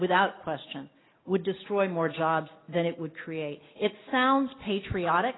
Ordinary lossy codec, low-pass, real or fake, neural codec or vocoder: AAC, 16 kbps; 7.2 kHz; real; none